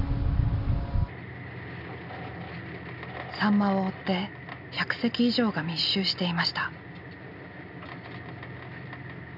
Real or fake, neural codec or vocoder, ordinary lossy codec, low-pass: real; none; none; 5.4 kHz